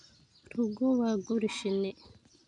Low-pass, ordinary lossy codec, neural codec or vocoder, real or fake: 9.9 kHz; none; none; real